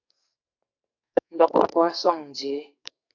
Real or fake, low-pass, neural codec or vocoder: fake; 7.2 kHz; codec, 44.1 kHz, 2.6 kbps, SNAC